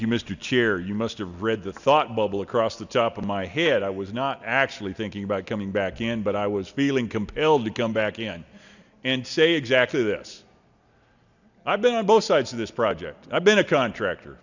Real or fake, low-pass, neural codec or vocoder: real; 7.2 kHz; none